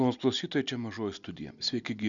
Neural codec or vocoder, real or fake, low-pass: none; real; 7.2 kHz